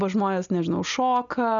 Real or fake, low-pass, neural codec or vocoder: real; 7.2 kHz; none